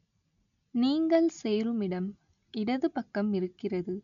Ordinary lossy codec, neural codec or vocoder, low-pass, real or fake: none; none; 7.2 kHz; real